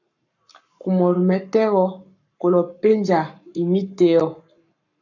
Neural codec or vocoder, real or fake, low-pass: codec, 44.1 kHz, 7.8 kbps, Pupu-Codec; fake; 7.2 kHz